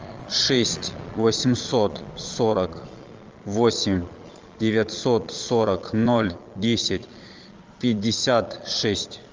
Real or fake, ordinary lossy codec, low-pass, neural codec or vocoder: fake; Opus, 24 kbps; 7.2 kHz; vocoder, 22.05 kHz, 80 mel bands, Vocos